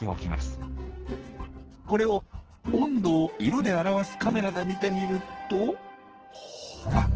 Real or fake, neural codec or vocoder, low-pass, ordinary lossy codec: fake; codec, 44.1 kHz, 2.6 kbps, SNAC; 7.2 kHz; Opus, 16 kbps